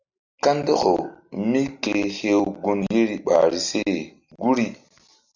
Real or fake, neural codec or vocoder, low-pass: real; none; 7.2 kHz